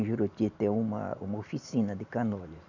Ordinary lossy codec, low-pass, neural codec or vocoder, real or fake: none; 7.2 kHz; none; real